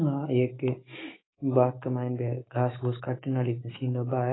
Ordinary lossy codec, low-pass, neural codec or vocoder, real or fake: AAC, 16 kbps; 7.2 kHz; autoencoder, 48 kHz, 128 numbers a frame, DAC-VAE, trained on Japanese speech; fake